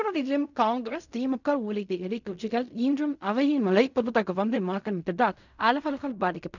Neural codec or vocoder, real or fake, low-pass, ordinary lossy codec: codec, 16 kHz in and 24 kHz out, 0.4 kbps, LongCat-Audio-Codec, fine tuned four codebook decoder; fake; 7.2 kHz; none